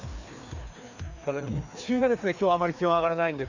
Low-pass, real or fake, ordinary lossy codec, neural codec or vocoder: 7.2 kHz; fake; none; codec, 16 kHz, 2 kbps, FreqCodec, larger model